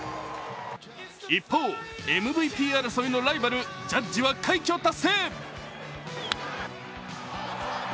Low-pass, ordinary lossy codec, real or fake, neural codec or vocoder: none; none; real; none